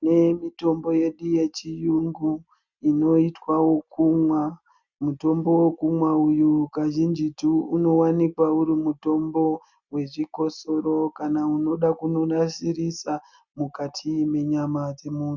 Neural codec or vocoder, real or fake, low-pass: none; real; 7.2 kHz